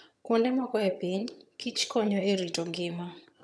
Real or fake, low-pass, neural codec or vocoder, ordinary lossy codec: fake; none; vocoder, 22.05 kHz, 80 mel bands, HiFi-GAN; none